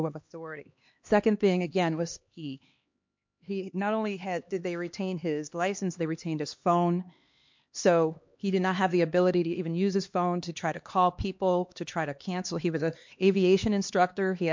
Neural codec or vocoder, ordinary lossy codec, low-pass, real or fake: codec, 16 kHz, 2 kbps, X-Codec, HuBERT features, trained on LibriSpeech; MP3, 48 kbps; 7.2 kHz; fake